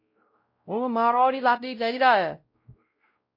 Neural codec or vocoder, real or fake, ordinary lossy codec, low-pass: codec, 16 kHz, 0.5 kbps, X-Codec, WavLM features, trained on Multilingual LibriSpeech; fake; MP3, 32 kbps; 5.4 kHz